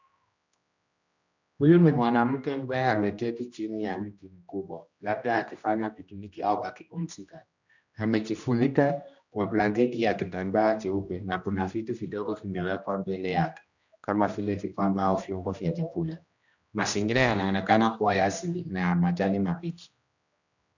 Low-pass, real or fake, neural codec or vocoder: 7.2 kHz; fake; codec, 16 kHz, 1 kbps, X-Codec, HuBERT features, trained on general audio